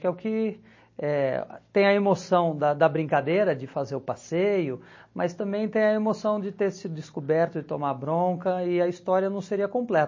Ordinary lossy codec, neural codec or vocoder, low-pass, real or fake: MP3, 32 kbps; none; 7.2 kHz; real